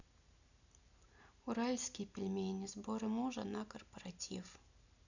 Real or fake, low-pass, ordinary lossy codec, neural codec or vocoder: real; 7.2 kHz; none; none